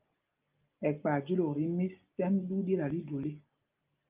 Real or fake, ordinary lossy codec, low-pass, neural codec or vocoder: real; Opus, 32 kbps; 3.6 kHz; none